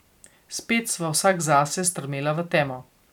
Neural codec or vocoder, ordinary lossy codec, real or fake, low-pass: none; none; real; 19.8 kHz